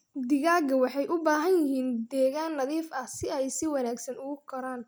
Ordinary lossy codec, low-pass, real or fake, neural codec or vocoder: none; none; real; none